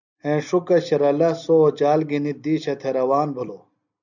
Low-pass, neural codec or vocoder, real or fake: 7.2 kHz; none; real